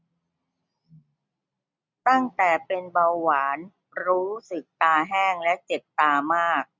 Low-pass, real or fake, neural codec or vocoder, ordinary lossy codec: none; real; none; none